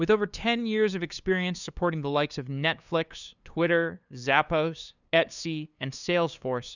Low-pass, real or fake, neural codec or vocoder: 7.2 kHz; fake; codec, 16 kHz, 2 kbps, FunCodec, trained on LibriTTS, 25 frames a second